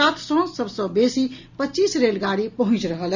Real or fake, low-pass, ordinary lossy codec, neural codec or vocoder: real; 7.2 kHz; none; none